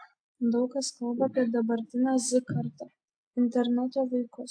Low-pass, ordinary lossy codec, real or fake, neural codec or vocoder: 9.9 kHz; AAC, 64 kbps; real; none